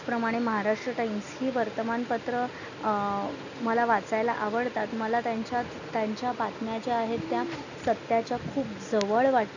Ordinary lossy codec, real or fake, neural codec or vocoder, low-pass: none; real; none; 7.2 kHz